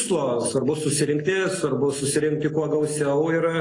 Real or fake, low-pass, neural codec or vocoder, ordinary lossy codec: real; 10.8 kHz; none; AAC, 32 kbps